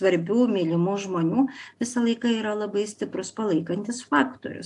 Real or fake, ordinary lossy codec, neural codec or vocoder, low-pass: real; AAC, 64 kbps; none; 10.8 kHz